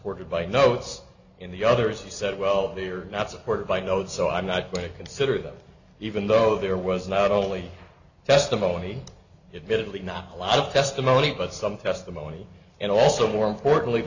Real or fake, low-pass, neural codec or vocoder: real; 7.2 kHz; none